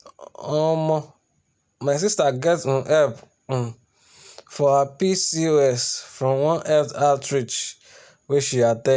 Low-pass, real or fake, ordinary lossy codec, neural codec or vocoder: none; real; none; none